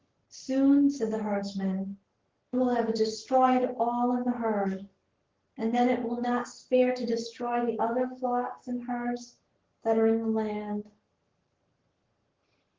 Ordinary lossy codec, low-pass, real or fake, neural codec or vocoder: Opus, 16 kbps; 7.2 kHz; fake; codec, 44.1 kHz, 7.8 kbps, Pupu-Codec